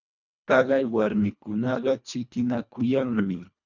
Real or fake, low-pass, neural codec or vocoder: fake; 7.2 kHz; codec, 24 kHz, 1.5 kbps, HILCodec